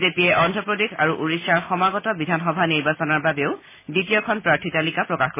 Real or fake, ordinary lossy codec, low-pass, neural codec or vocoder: real; MP3, 16 kbps; 3.6 kHz; none